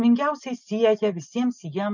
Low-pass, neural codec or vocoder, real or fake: 7.2 kHz; none; real